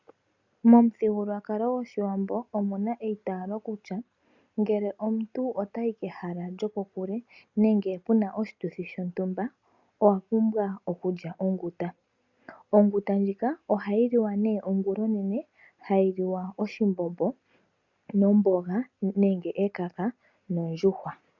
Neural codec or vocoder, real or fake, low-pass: none; real; 7.2 kHz